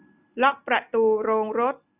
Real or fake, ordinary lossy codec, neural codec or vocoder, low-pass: real; none; none; 3.6 kHz